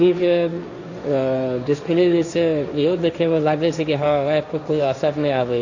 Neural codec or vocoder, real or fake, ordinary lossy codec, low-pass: codec, 16 kHz, 1.1 kbps, Voila-Tokenizer; fake; none; 7.2 kHz